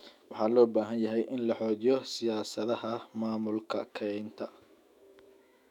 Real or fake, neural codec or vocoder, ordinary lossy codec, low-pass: fake; autoencoder, 48 kHz, 128 numbers a frame, DAC-VAE, trained on Japanese speech; none; 19.8 kHz